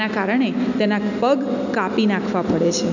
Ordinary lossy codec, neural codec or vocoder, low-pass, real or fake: none; none; 7.2 kHz; real